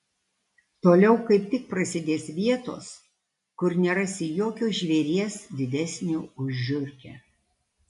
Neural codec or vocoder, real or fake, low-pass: none; real; 10.8 kHz